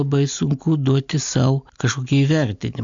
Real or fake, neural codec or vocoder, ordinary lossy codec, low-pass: real; none; MP3, 64 kbps; 7.2 kHz